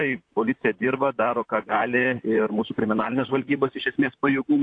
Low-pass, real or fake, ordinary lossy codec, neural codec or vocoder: 9.9 kHz; fake; AAC, 48 kbps; vocoder, 44.1 kHz, 128 mel bands, Pupu-Vocoder